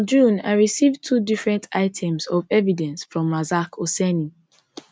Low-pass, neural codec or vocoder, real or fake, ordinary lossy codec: none; none; real; none